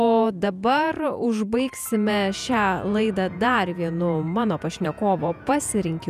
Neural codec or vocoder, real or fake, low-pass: vocoder, 48 kHz, 128 mel bands, Vocos; fake; 14.4 kHz